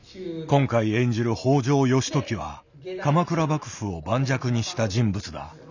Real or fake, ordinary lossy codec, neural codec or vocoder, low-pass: real; none; none; 7.2 kHz